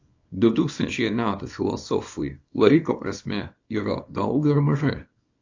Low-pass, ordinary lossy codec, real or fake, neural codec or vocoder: 7.2 kHz; AAC, 48 kbps; fake; codec, 24 kHz, 0.9 kbps, WavTokenizer, small release